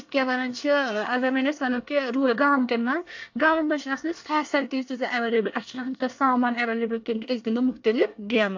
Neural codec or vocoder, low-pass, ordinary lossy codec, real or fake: codec, 24 kHz, 1 kbps, SNAC; 7.2 kHz; AAC, 48 kbps; fake